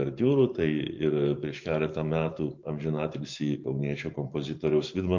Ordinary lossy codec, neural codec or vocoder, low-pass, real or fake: MP3, 48 kbps; vocoder, 44.1 kHz, 128 mel bands every 512 samples, BigVGAN v2; 7.2 kHz; fake